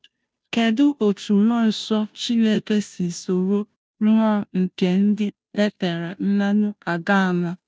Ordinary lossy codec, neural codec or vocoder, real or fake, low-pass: none; codec, 16 kHz, 0.5 kbps, FunCodec, trained on Chinese and English, 25 frames a second; fake; none